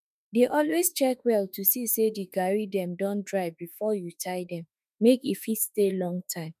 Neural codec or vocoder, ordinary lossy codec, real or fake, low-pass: autoencoder, 48 kHz, 32 numbers a frame, DAC-VAE, trained on Japanese speech; none; fake; 14.4 kHz